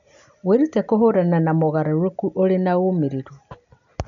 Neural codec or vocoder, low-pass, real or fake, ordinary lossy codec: none; 7.2 kHz; real; none